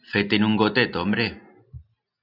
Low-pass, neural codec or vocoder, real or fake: 5.4 kHz; none; real